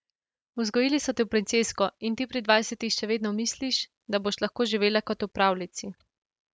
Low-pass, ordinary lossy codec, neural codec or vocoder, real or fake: none; none; none; real